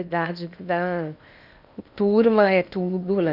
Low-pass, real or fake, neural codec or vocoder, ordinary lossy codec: 5.4 kHz; fake; codec, 16 kHz in and 24 kHz out, 0.8 kbps, FocalCodec, streaming, 65536 codes; none